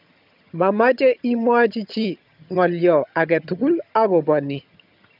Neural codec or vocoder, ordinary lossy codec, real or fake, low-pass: vocoder, 22.05 kHz, 80 mel bands, HiFi-GAN; none; fake; 5.4 kHz